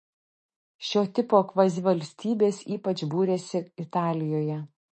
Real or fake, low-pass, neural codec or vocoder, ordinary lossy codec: fake; 10.8 kHz; autoencoder, 48 kHz, 128 numbers a frame, DAC-VAE, trained on Japanese speech; MP3, 32 kbps